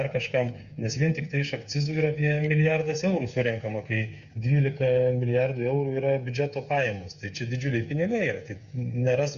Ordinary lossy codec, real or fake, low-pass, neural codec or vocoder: Opus, 64 kbps; fake; 7.2 kHz; codec, 16 kHz, 8 kbps, FreqCodec, smaller model